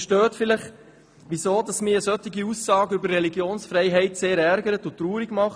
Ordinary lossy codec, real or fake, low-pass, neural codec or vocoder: none; real; none; none